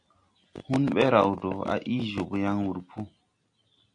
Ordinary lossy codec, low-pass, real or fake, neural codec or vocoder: AAC, 64 kbps; 9.9 kHz; real; none